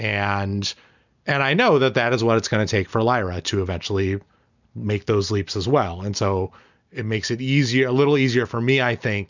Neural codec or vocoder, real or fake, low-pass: none; real; 7.2 kHz